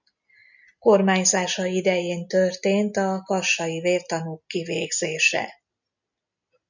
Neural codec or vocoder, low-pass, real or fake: none; 7.2 kHz; real